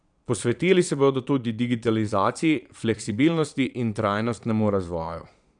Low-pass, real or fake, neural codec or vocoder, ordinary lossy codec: 9.9 kHz; fake; vocoder, 22.05 kHz, 80 mel bands, Vocos; none